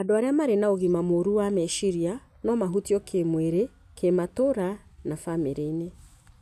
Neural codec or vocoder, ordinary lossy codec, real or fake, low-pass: none; none; real; 14.4 kHz